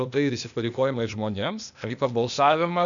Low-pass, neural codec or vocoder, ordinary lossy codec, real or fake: 7.2 kHz; codec, 16 kHz, 0.8 kbps, ZipCodec; MP3, 96 kbps; fake